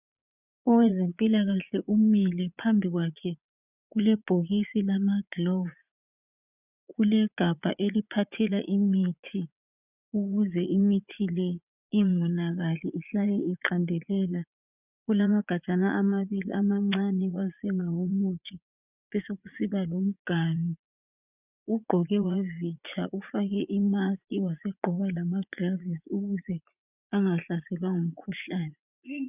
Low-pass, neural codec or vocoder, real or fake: 3.6 kHz; vocoder, 44.1 kHz, 80 mel bands, Vocos; fake